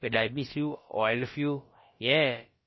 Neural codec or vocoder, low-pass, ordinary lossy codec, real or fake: codec, 16 kHz, about 1 kbps, DyCAST, with the encoder's durations; 7.2 kHz; MP3, 24 kbps; fake